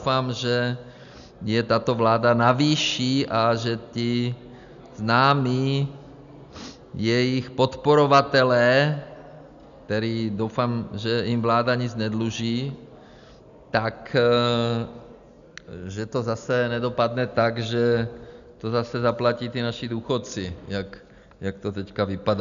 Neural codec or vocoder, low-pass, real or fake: none; 7.2 kHz; real